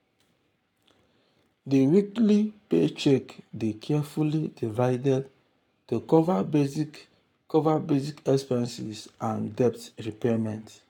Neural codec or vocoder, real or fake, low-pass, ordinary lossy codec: codec, 44.1 kHz, 7.8 kbps, Pupu-Codec; fake; 19.8 kHz; none